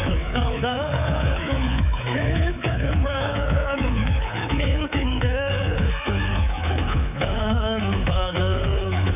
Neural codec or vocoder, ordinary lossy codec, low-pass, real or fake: codec, 24 kHz, 3.1 kbps, DualCodec; none; 3.6 kHz; fake